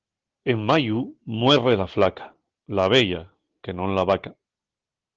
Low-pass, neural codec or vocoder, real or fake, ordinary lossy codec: 7.2 kHz; none; real; Opus, 16 kbps